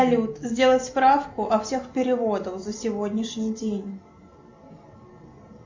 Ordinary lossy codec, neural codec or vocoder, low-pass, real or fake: MP3, 48 kbps; none; 7.2 kHz; real